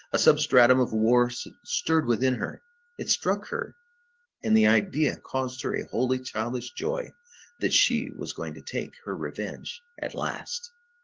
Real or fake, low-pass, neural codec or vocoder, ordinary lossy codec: real; 7.2 kHz; none; Opus, 16 kbps